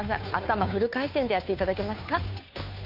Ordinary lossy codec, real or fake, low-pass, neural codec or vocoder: none; fake; 5.4 kHz; codec, 16 kHz, 2 kbps, FunCodec, trained on Chinese and English, 25 frames a second